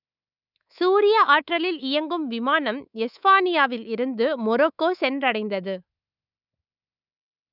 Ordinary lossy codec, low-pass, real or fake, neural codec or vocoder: none; 5.4 kHz; fake; codec, 24 kHz, 3.1 kbps, DualCodec